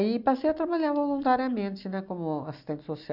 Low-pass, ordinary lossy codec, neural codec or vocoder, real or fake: 5.4 kHz; none; none; real